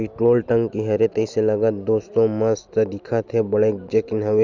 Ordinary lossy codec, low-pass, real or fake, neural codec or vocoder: Opus, 64 kbps; 7.2 kHz; real; none